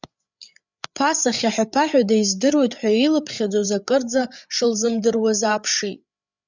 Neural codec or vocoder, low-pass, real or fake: codec, 16 kHz, 8 kbps, FreqCodec, larger model; 7.2 kHz; fake